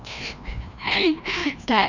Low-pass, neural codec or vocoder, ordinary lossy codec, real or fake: 7.2 kHz; codec, 16 kHz, 1 kbps, FreqCodec, larger model; none; fake